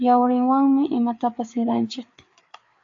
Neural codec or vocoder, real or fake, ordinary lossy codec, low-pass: codec, 16 kHz, 4 kbps, FreqCodec, larger model; fake; AAC, 48 kbps; 7.2 kHz